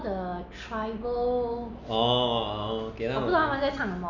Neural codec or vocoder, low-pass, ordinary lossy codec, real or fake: none; 7.2 kHz; none; real